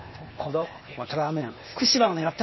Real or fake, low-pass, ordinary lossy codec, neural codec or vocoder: fake; 7.2 kHz; MP3, 24 kbps; codec, 16 kHz, 0.8 kbps, ZipCodec